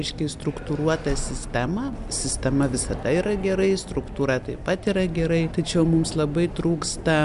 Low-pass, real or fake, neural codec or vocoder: 10.8 kHz; real; none